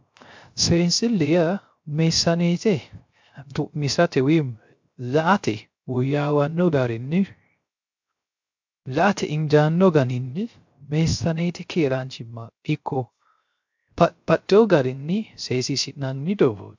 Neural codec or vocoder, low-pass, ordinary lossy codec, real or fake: codec, 16 kHz, 0.3 kbps, FocalCodec; 7.2 kHz; MP3, 64 kbps; fake